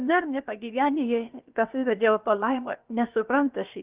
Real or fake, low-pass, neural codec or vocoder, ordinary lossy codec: fake; 3.6 kHz; codec, 16 kHz, about 1 kbps, DyCAST, with the encoder's durations; Opus, 32 kbps